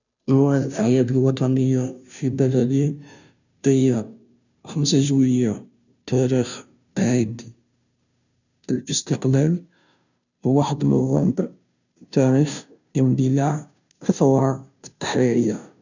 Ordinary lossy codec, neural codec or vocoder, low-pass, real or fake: none; codec, 16 kHz, 0.5 kbps, FunCodec, trained on Chinese and English, 25 frames a second; 7.2 kHz; fake